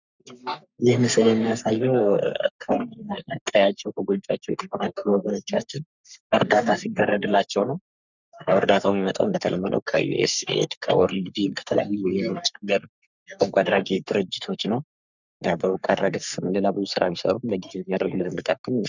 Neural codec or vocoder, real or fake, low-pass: codec, 44.1 kHz, 3.4 kbps, Pupu-Codec; fake; 7.2 kHz